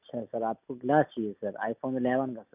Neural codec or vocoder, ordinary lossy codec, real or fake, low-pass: none; none; real; 3.6 kHz